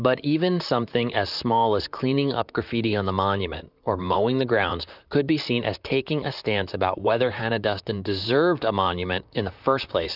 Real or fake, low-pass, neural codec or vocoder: fake; 5.4 kHz; vocoder, 44.1 kHz, 128 mel bands, Pupu-Vocoder